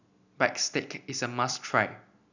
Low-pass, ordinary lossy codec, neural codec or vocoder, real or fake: 7.2 kHz; none; none; real